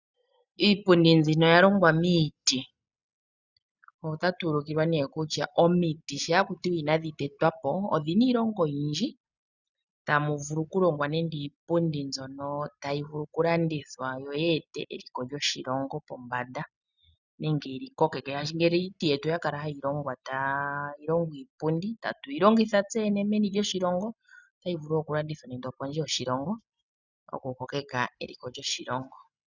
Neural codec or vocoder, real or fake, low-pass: none; real; 7.2 kHz